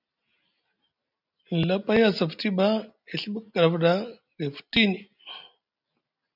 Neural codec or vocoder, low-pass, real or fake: none; 5.4 kHz; real